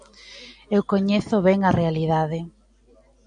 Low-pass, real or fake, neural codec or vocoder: 9.9 kHz; real; none